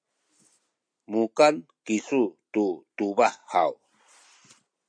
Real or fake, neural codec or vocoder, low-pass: real; none; 9.9 kHz